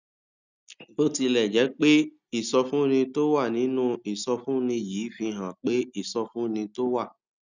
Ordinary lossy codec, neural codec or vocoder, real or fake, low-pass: none; none; real; 7.2 kHz